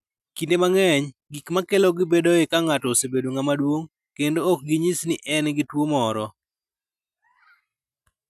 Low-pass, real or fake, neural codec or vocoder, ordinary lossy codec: 14.4 kHz; real; none; none